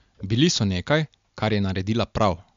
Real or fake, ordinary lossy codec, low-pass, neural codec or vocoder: real; MP3, 64 kbps; 7.2 kHz; none